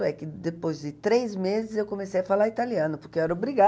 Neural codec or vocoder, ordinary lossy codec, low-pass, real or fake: none; none; none; real